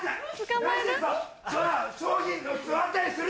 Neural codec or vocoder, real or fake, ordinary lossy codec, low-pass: none; real; none; none